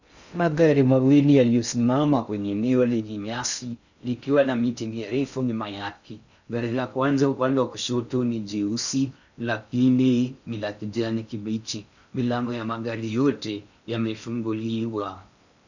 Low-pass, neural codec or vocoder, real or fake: 7.2 kHz; codec, 16 kHz in and 24 kHz out, 0.6 kbps, FocalCodec, streaming, 2048 codes; fake